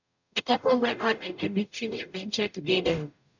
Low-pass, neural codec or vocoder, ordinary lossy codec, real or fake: 7.2 kHz; codec, 44.1 kHz, 0.9 kbps, DAC; none; fake